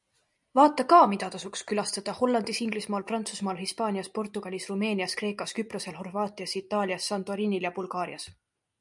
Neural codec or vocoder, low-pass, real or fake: none; 10.8 kHz; real